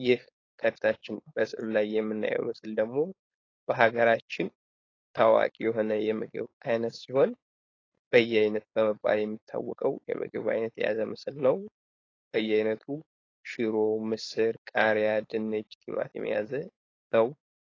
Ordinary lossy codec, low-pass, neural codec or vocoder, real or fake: AAC, 32 kbps; 7.2 kHz; codec, 16 kHz, 4.8 kbps, FACodec; fake